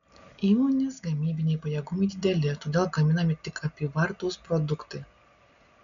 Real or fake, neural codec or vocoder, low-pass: real; none; 7.2 kHz